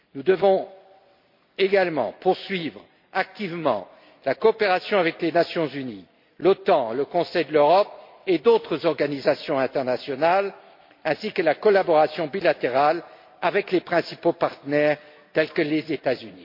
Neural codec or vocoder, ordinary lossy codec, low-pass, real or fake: none; MP3, 32 kbps; 5.4 kHz; real